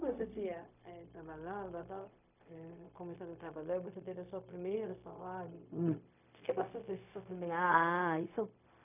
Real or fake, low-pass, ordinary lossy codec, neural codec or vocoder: fake; 3.6 kHz; none; codec, 16 kHz, 0.4 kbps, LongCat-Audio-Codec